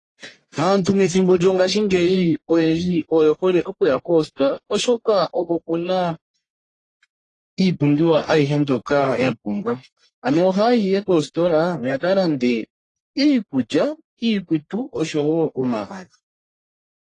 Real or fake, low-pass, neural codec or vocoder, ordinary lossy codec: fake; 10.8 kHz; codec, 44.1 kHz, 1.7 kbps, Pupu-Codec; AAC, 32 kbps